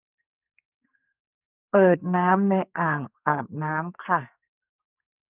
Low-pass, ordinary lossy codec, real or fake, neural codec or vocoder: 3.6 kHz; none; fake; codec, 44.1 kHz, 2.6 kbps, SNAC